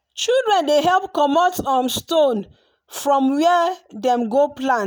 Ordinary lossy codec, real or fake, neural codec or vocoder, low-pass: none; real; none; none